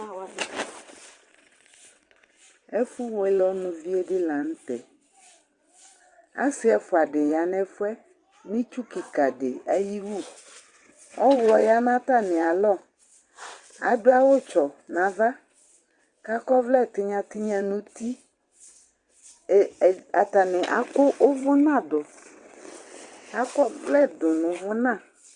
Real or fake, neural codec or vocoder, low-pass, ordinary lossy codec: fake; vocoder, 22.05 kHz, 80 mel bands, WaveNeXt; 9.9 kHz; Opus, 64 kbps